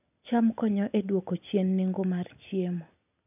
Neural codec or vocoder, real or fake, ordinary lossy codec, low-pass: none; real; none; 3.6 kHz